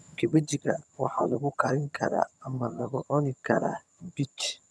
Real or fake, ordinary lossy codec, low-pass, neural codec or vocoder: fake; none; none; vocoder, 22.05 kHz, 80 mel bands, HiFi-GAN